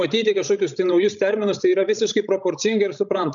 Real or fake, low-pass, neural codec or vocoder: fake; 7.2 kHz; codec, 16 kHz, 16 kbps, FreqCodec, larger model